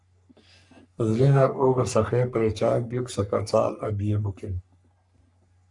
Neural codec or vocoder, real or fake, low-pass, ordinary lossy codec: codec, 44.1 kHz, 3.4 kbps, Pupu-Codec; fake; 10.8 kHz; MP3, 96 kbps